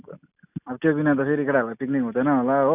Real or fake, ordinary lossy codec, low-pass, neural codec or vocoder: real; none; 3.6 kHz; none